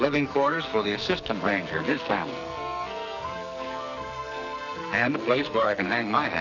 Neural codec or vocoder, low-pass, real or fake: codec, 44.1 kHz, 2.6 kbps, SNAC; 7.2 kHz; fake